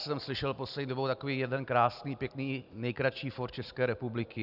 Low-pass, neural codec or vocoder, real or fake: 5.4 kHz; none; real